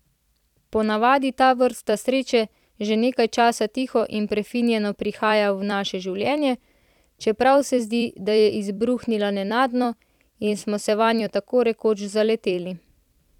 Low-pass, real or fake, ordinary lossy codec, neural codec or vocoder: 19.8 kHz; fake; none; vocoder, 44.1 kHz, 128 mel bands every 256 samples, BigVGAN v2